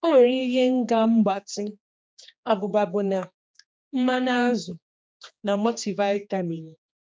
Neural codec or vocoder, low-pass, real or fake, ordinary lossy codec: codec, 16 kHz, 2 kbps, X-Codec, HuBERT features, trained on general audio; none; fake; none